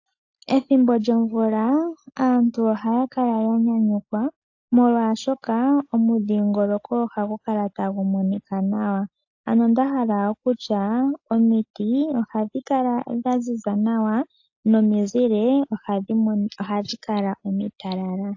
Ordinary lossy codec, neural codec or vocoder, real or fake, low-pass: AAC, 48 kbps; none; real; 7.2 kHz